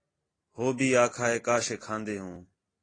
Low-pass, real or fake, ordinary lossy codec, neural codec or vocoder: 9.9 kHz; real; AAC, 32 kbps; none